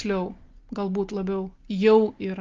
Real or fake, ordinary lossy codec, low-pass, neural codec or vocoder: real; Opus, 32 kbps; 7.2 kHz; none